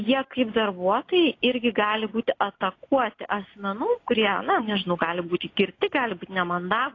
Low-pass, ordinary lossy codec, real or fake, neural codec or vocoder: 7.2 kHz; AAC, 32 kbps; real; none